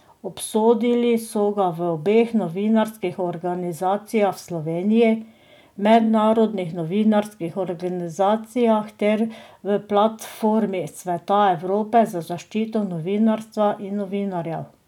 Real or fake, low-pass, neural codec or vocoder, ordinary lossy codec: real; 19.8 kHz; none; none